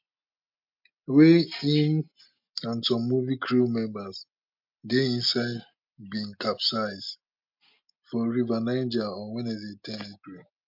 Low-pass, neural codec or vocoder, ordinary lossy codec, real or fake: 5.4 kHz; none; MP3, 48 kbps; real